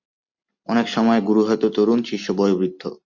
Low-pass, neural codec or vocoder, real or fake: 7.2 kHz; none; real